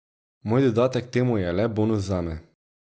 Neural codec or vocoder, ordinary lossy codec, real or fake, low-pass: none; none; real; none